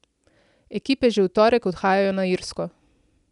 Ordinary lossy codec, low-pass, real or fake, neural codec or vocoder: none; 10.8 kHz; real; none